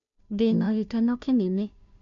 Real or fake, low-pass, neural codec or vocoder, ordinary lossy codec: fake; 7.2 kHz; codec, 16 kHz, 0.5 kbps, FunCodec, trained on Chinese and English, 25 frames a second; MP3, 64 kbps